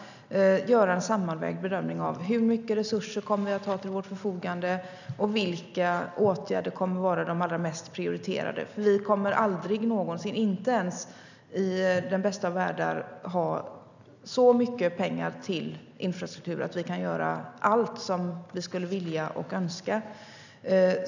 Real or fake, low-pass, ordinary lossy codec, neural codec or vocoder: real; 7.2 kHz; none; none